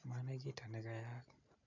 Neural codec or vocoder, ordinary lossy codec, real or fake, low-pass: none; none; real; 7.2 kHz